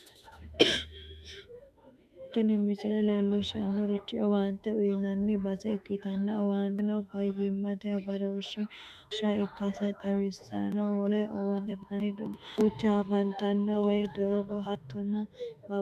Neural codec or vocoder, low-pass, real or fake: autoencoder, 48 kHz, 32 numbers a frame, DAC-VAE, trained on Japanese speech; 14.4 kHz; fake